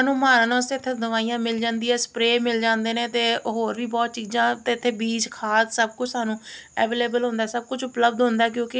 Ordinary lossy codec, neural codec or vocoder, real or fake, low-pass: none; none; real; none